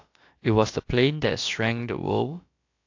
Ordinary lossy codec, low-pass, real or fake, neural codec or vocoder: AAC, 48 kbps; 7.2 kHz; fake; codec, 16 kHz, about 1 kbps, DyCAST, with the encoder's durations